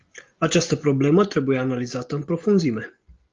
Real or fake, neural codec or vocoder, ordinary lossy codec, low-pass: real; none; Opus, 32 kbps; 7.2 kHz